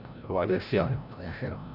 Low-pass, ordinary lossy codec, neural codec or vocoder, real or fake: 5.4 kHz; none; codec, 16 kHz, 0.5 kbps, FreqCodec, larger model; fake